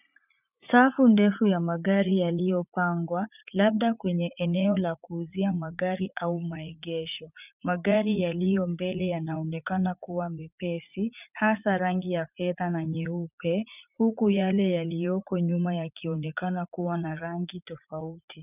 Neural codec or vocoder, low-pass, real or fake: vocoder, 44.1 kHz, 80 mel bands, Vocos; 3.6 kHz; fake